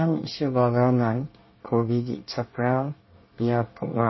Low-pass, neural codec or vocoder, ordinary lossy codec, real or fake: 7.2 kHz; codec, 24 kHz, 1 kbps, SNAC; MP3, 24 kbps; fake